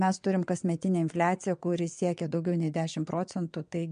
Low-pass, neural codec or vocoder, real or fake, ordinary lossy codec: 9.9 kHz; vocoder, 22.05 kHz, 80 mel bands, WaveNeXt; fake; MP3, 64 kbps